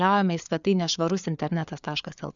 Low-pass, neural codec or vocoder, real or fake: 7.2 kHz; codec, 16 kHz, 4 kbps, FreqCodec, larger model; fake